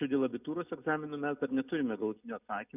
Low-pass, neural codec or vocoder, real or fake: 3.6 kHz; none; real